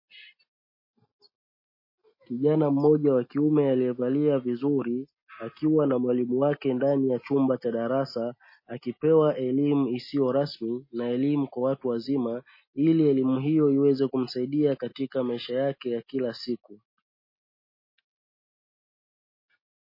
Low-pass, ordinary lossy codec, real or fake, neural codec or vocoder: 5.4 kHz; MP3, 24 kbps; real; none